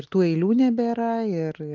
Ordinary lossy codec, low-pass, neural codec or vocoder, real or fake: Opus, 32 kbps; 7.2 kHz; none; real